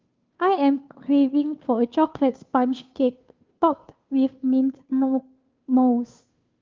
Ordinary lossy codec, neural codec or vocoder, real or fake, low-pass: Opus, 24 kbps; codec, 16 kHz, 2 kbps, FunCodec, trained on Chinese and English, 25 frames a second; fake; 7.2 kHz